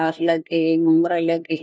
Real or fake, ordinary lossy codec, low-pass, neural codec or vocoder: fake; none; none; codec, 16 kHz, 1 kbps, FunCodec, trained on LibriTTS, 50 frames a second